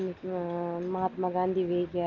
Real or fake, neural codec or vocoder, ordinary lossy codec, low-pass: real; none; Opus, 24 kbps; 7.2 kHz